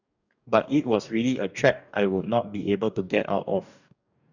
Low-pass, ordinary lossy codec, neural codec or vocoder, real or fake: 7.2 kHz; none; codec, 44.1 kHz, 2.6 kbps, DAC; fake